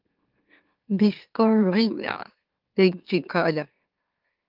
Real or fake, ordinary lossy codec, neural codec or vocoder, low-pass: fake; Opus, 32 kbps; autoencoder, 44.1 kHz, a latent of 192 numbers a frame, MeloTTS; 5.4 kHz